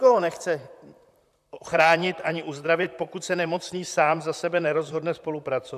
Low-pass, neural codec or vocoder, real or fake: 14.4 kHz; vocoder, 44.1 kHz, 128 mel bands, Pupu-Vocoder; fake